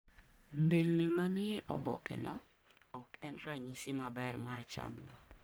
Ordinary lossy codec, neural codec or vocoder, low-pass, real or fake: none; codec, 44.1 kHz, 1.7 kbps, Pupu-Codec; none; fake